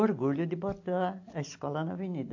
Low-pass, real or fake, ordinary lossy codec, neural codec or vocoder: 7.2 kHz; real; AAC, 48 kbps; none